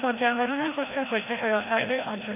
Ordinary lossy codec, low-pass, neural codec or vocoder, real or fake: MP3, 32 kbps; 3.6 kHz; codec, 16 kHz, 1 kbps, FreqCodec, larger model; fake